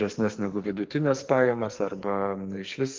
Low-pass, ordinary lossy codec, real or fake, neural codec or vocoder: 7.2 kHz; Opus, 32 kbps; fake; codec, 44.1 kHz, 2.6 kbps, SNAC